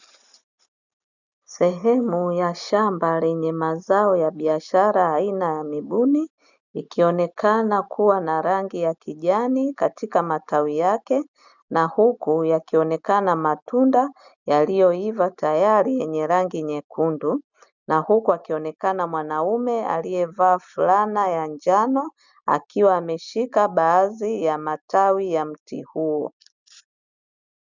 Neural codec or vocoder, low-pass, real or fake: none; 7.2 kHz; real